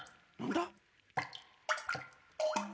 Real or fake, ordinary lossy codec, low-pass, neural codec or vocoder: real; none; none; none